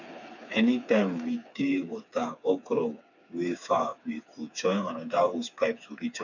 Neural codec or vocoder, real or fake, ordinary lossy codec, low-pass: codec, 16 kHz, 4 kbps, FreqCodec, smaller model; fake; none; 7.2 kHz